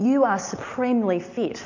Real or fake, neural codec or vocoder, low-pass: real; none; 7.2 kHz